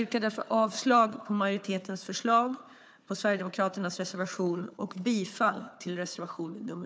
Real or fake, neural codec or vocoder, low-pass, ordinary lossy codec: fake; codec, 16 kHz, 4 kbps, FunCodec, trained on Chinese and English, 50 frames a second; none; none